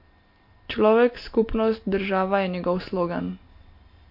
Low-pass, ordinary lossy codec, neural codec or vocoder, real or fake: 5.4 kHz; MP3, 32 kbps; none; real